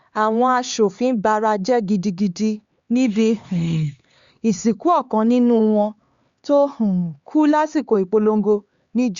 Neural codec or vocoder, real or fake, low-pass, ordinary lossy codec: codec, 16 kHz, 4 kbps, X-Codec, HuBERT features, trained on LibriSpeech; fake; 7.2 kHz; Opus, 64 kbps